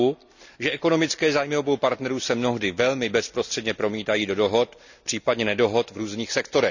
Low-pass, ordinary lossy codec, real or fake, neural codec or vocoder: 7.2 kHz; none; real; none